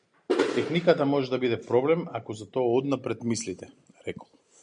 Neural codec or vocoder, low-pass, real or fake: none; 9.9 kHz; real